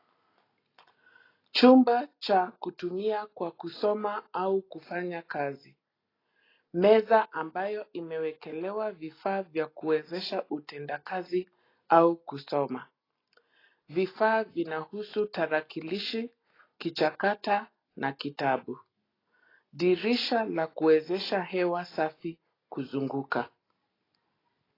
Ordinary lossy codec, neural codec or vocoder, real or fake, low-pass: AAC, 24 kbps; none; real; 5.4 kHz